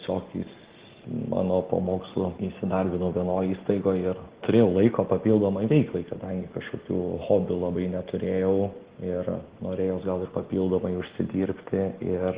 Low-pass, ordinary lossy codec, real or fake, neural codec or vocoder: 3.6 kHz; Opus, 16 kbps; real; none